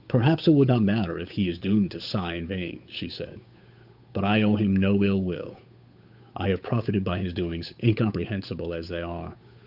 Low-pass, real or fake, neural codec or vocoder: 5.4 kHz; fake; codec, 16 kHz, 8 kbps, FunCodec, trained on Chinese and English, 25 frames a second